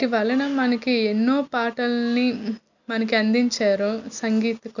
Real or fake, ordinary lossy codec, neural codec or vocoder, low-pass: real; none; none; 7.2 kHz